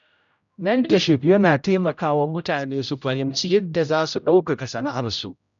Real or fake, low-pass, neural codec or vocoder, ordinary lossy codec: fake; 7.2 kHz; codec, 16 kHz, 0.5 kbps, X-Codec, HuBERT features, trained on general audio; none